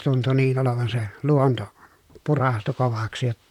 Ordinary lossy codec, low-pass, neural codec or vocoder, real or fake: none; 19.8 kHz; vocoder, 44.1 kHz, 128 mel bands, Pupu-Vocoder; fake